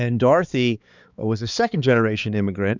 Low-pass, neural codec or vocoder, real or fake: 7.2 kHz; codec, 16 kHz, 4 kbps, X-Codec, HuBERT features, trained on balanced general audio; fake